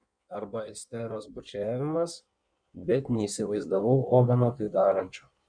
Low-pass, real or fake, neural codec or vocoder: 9.9 kHz; fake; codec, 16 kHz in and 24 kHz out, 1.1 kbps, FireRedTTS-2 codec